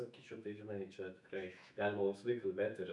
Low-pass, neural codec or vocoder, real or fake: 10.8 kHz; autoencoder, 48 kHz, 128 numbers a frame, DAC-VAE, trained on Japanese speech; fake